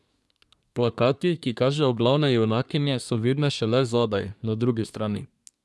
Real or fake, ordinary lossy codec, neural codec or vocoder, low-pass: fake; none; codec, 24 kHz, 1 kbps, SNAC; none